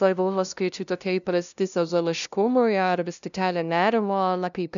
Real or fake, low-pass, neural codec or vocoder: fake; 7.2 kHz; codec, 16 kHz, 0.5 kbps, FunCodec, trained on LibriTTS, 25 frames a second